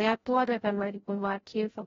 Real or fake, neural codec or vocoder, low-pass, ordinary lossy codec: fake; codec, 16 kHz, 0.5 kbps, FreqCodec, larger model; 7.2 kHz; AAC, 24 kbps